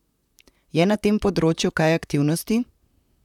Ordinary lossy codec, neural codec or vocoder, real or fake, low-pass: none; vocoder, 44.1 kHz, 128 mel bands, Pupu-Vocoder; fake; 19.8 kHz